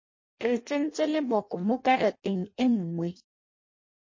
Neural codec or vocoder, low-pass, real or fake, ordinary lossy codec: codec, 16 kHz in and 24 kHz out, 0.6 kbps, FireRedTTS-2 codec; 7.2 kHz; fake; MP3, 32 kbps